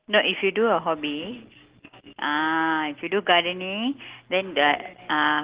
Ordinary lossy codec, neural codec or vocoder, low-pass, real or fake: Opus, 16 kbps; none; 3.6 kHz; real